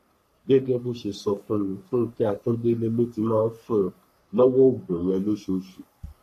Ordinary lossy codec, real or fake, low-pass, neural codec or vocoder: MP3, 64 kbps; fake; 14.4 kHz; codec, 44.1 kHz, 3.4 kbps, Pupu-Codec